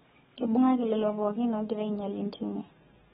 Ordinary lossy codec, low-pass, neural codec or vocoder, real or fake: AAC, 16 kbps; 19.8 kHz; codec, 44.1 kHz, 7.8 kbps, DAC; fake